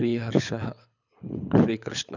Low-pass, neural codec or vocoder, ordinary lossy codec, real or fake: 7.2 kHz; codec, 24 kHz, 6 kbps, HILCodec; none; fake